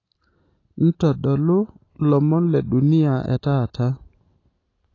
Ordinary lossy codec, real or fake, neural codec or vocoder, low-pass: AAC, 32 kbps; real; none; 7.2 kHz